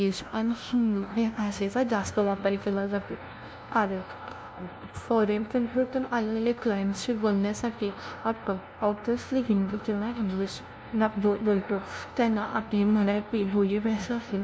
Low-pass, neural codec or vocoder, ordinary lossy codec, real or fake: none; codec, 16 kHz, 0.5 kbps, FunCodec, trained on LibriTTS, 25 frames a second; none; fake